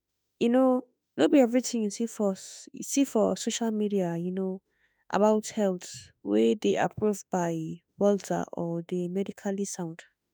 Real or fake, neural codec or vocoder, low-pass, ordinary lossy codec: fake; autoencoder, 48 kHz, 32 numbers a frame, DAC-VAE, trained on Japanese speech; none; none